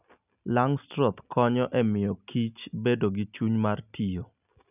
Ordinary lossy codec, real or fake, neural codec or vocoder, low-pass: none; real; none; 3.6 kHz